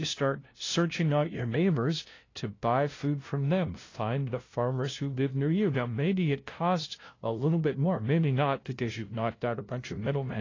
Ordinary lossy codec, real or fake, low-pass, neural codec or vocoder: AAC, 32 kbps; fake; 7.2 kHz; codec, 16 kHz, 0.5 kbps, FunCodec, trained on LibriTTS, 25 frames a second